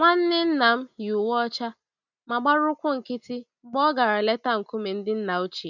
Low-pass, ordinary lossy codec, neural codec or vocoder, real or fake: 7.2 kHz; none; none; real